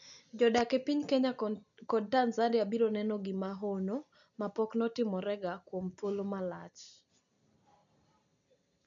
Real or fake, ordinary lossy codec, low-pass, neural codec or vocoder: real; none; 7.2 kHz; none